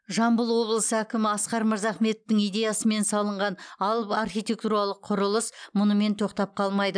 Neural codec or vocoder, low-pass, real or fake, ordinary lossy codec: none; 9.9 kHz; real; none